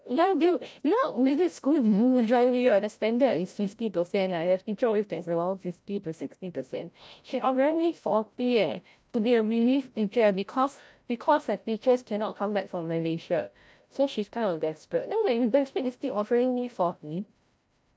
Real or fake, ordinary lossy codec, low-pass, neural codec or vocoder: fake; none; none; codec, 16 kHz, 0.5 kbps, FreqCodec, larger model